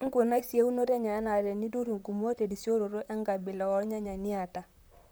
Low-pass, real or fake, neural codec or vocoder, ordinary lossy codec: none; fake; vocoder, 44.1 kHz, 128 mel bands, Pupu-Vocoder; none